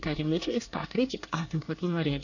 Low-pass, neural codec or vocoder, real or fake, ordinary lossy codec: 7.2 kHz; codec, 24 kHz, 1 kbps, SNAC; fake; none